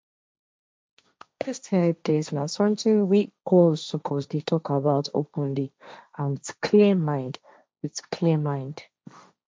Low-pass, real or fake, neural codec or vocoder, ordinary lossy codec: none; fake; codec, 16 kHz, 1.1 kbps, Voila-Tokenizer; none